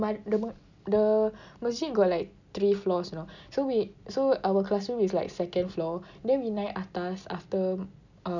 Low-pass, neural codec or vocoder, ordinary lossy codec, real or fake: 7.2 kHz; none; none; real